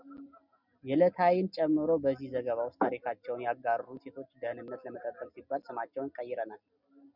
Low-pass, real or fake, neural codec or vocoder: 5.4 kHz; real; none